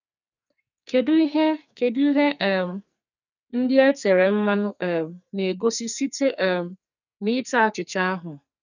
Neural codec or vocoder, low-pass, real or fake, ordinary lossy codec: codec, 44.1 kHz, 2.6 kbps, SNAC; 7.2 kHz; fake; none